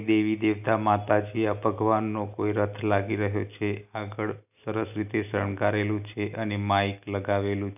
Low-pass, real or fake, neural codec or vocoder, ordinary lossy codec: 3.6 kHz; real; none; none